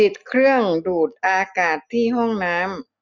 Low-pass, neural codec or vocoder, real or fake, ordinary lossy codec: 7.2 kHz; none; real; none